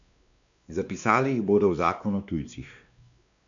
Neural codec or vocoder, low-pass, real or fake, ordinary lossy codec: codec, 16 kHz, 2 kbps, X-Codec, WavLM features, trained on Multilingual LibriSpeech; 7.2 kHz; fake; none